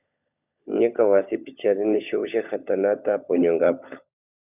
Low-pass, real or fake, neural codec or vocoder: 3.6 kHz; fake; codec, 16 kHz, 16 kbps, FunCodec, trained on LibriTTS, 50 frames a second